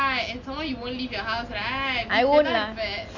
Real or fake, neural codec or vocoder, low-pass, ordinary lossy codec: real; none; 7.2 kHz; none